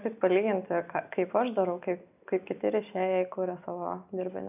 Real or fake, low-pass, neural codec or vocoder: real; 3.6 kHz; none